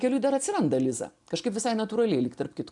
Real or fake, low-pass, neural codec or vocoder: real; 10.8 kHz; none